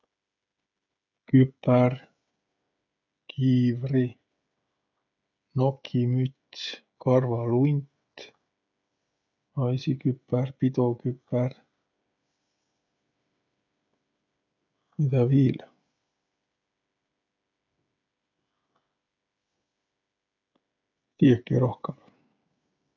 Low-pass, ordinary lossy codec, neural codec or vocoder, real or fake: 7.2 kHz; MP3, 64 kbps; codec, 16 kHz, 16 kbps, FreqCodec, smaller model; fake